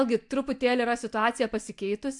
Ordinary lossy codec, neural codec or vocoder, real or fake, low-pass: MP3, 64 kbps; none; real; 10.8 kHz